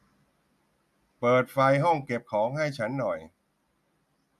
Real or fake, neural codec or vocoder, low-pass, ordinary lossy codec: real; none; 14.4 kHz; none